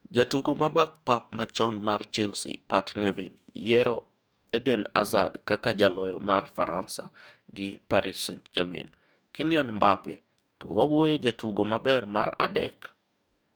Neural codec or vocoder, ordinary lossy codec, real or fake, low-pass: codec, 44.1 kHz, 2.6 kbps, DAC; none; fake; none